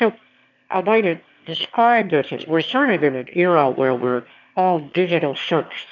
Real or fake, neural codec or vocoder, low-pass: fake; autoencoder, 22.05 kHz, a latent of 192 numbers a frame, VITS, trained on one speaker; 7.2 kHz